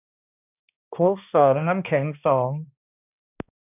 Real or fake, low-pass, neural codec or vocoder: fake; 3.6 kHz; codec, 16 kHz, 1 kbps, X-Codec, HuBERT features, trained on balanced general audio